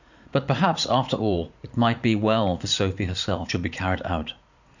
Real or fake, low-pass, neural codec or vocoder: fake; 7.2 kHz; vocoder, 44.1 kHz, 80 mel bands, Vocos